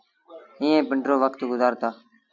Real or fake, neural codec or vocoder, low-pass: real; none; 7.2 kHz